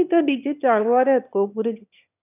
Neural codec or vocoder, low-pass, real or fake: autoencoder, 22.05 kHz, a latent of 192 numbers a frame, VITS, trained on one speaker; 3.6 kHz; fake